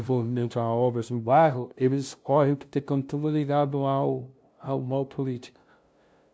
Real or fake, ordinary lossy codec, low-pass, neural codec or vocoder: fake; none; none; codec, 16 kHz, 0.5 kbps, FunCodec, trained on LibriTTS, 25 frames a second